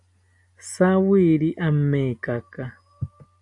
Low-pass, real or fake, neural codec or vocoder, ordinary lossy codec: 10.8 kHz; real; none; AAC, 64 kbps